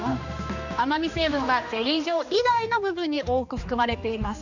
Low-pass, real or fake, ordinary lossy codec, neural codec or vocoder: 7.2 kHz; fake; none; codec, 16 kHz, 2 kbps, X-Codec, HuBERT features, trained on general audio